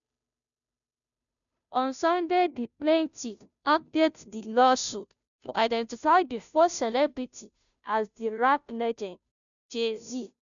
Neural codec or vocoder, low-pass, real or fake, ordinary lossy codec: codec, 16 kHz, 0.5 kbps, FunCodec, trained on Chinese and English, 25 frames a second; 7.2 kHz; fake; none